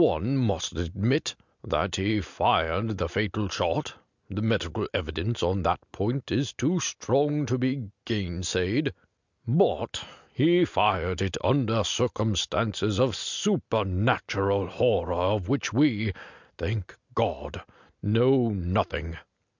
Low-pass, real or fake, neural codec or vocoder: 7.2 kHz; real; none